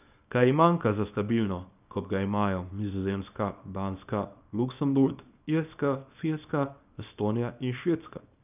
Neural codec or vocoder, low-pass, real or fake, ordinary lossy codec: codec, 24 kHz, 0.9 kbps, WavTokenizer, small release; 3.6 kHz; fake; none